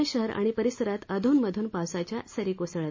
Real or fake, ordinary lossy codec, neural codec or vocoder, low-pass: real; MP3, 32 kbps; none; 7.2 kHz